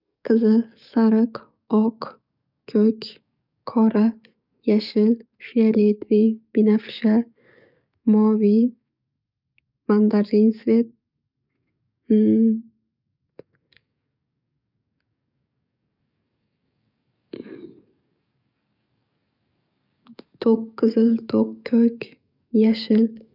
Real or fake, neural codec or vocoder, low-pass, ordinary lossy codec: fake; codec, 44.1 kHz, 7.8 kbps, DAC; 5.4 kHz; none